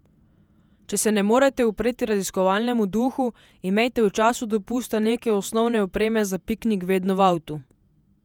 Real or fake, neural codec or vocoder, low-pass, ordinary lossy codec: fake; vocoder, 48 kHz, 128 mel bands, Vocos; 19.8 kHz; none